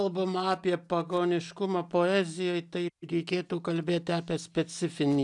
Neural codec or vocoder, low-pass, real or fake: none; 10.8 kHz; real